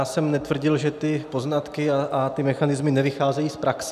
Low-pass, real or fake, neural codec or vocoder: 14.4 kHz; real; none